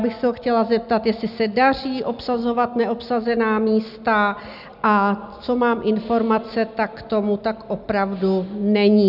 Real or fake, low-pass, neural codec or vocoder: real; 5.4 kHz; none